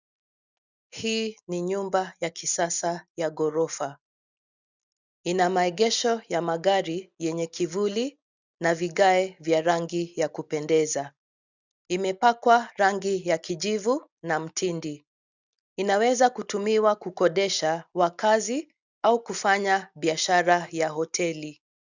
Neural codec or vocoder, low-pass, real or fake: none; 7.2 kHz; real